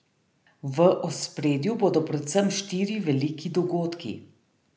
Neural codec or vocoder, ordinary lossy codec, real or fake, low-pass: none; none; real; none